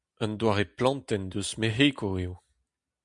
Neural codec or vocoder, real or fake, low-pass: none; real; 10.8 kHz